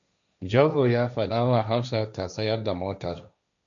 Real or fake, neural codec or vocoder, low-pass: fake; codec, 16 kHz, 1.1 kbps, Voila-Tokenizer; 7.2 kHz